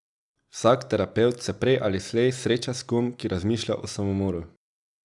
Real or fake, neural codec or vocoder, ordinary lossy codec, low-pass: real; none; none; 10.8 kHz